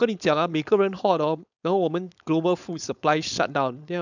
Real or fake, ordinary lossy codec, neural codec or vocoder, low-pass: fake; none; codec, 16 kHz, 4.8 kbps, FACodec; 7.2 kHz